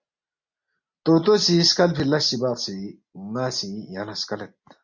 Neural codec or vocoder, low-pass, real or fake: none; 7.2 kHz; real